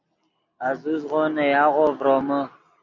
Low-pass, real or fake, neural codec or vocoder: 7.2 kHz; real; none